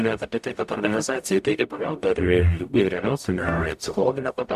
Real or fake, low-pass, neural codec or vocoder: fake; 14.4 kHz; codec, 44.1 kHz, 0.9 kbps, DAC